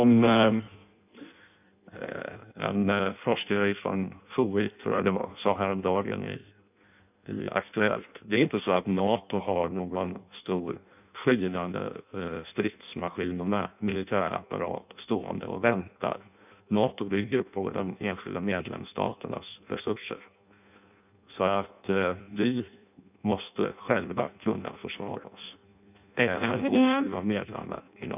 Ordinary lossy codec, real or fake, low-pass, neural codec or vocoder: none; fake; 3.6 kHz; codec, 16 kHz in and 24 kHz out, 0.6 kbps, FireRedTTS-2 codec